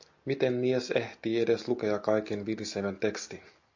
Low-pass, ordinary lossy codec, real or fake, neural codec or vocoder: 7.2 kHz; MP3, 48 kbps; real; none